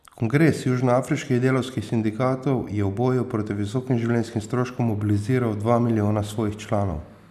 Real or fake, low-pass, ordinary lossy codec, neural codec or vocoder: real; 14.4 kHz; none; none